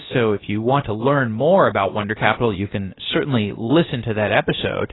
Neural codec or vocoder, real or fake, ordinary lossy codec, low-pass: codec, 16 kHz, about 1 kbps, DyCAST, with the encoder's durations; fake; AAC, 16 kbps; 7.2 kHz